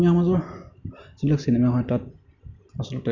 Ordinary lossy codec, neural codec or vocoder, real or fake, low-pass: none; none; real; 7.2 kHz